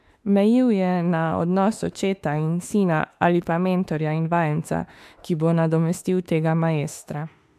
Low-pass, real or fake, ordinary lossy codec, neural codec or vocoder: 14.4 kHz; fake; AAC, 96 kbps; autoencoder, 48 kHz, 32 numbers a frame, DAC-VAE, trained on Japanese speech